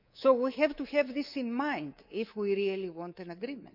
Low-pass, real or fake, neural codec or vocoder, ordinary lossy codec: 5.4 kHz; fake; codec, 24 kHz, 3.1 kbps, DualCodec; none